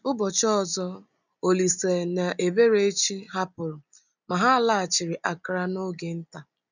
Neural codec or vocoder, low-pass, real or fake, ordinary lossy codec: none; 7.2 kHz; real; none